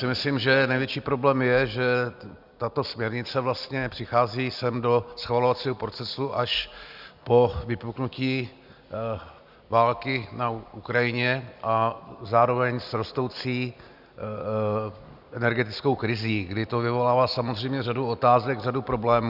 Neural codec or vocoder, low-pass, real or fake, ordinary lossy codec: none; 5.4 kHz; real; Opus, 64 kbps